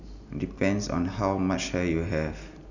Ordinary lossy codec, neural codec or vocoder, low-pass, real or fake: none; none; 7.2 kHz; real